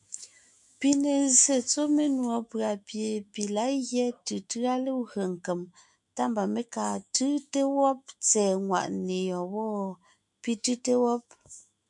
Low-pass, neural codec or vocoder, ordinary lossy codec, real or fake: 10.8 kHz; autoencoder, 48 kHz, 128 numbers a frame, DAC-VAE, trained on Japanese speech; MP3, 96 kbps; fake